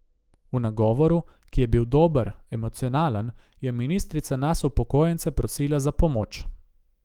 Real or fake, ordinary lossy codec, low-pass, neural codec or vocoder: fake; Opus, 24 kbps; 19.8 kHz; autoencoder, 48 kHz, 128 numbers a frame, DAC-VAE, trained on Japanese speech